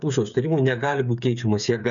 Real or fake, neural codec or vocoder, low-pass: fake; codec, 16 kHz, 8 kbps, FreqCodec, smaller model; 7.2 kHz